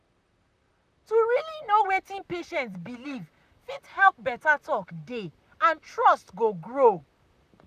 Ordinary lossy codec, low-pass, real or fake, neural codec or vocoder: none; 14.4 kHz; fake; vocoder, 44.1 kHz, 128 mel bands, Pupu-Vocoder